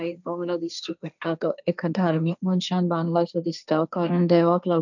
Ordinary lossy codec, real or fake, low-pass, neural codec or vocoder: none; fake; 7.2 kHz; codec, 16 kHz, 1.1 kbps, Voila-Tokenizer